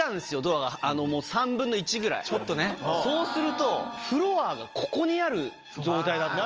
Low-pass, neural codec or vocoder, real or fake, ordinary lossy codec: 7.2 kHz; none; real; Opus, 24 kbps